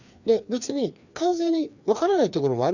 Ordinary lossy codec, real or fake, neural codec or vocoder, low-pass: none; fake; codec, 16 kHz, 2 kbps, FreqCodec, larger model; 7.2 kHz